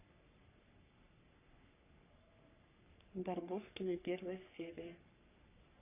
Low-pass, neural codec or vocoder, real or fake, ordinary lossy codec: 3.6 kHz; codec, 44.1 kHz, 3.4 kbps, Pupu-Codec; fake; none